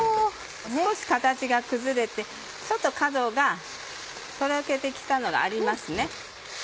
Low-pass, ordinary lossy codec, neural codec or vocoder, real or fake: none; none; none; real